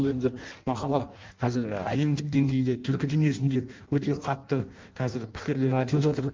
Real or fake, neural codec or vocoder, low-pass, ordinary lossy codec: fake; codec, 16 kHz in and 24 kHz out, 0.6 kbps, FireRedTTS-2 codec; 7.2 kHz; Opus, 24 kbps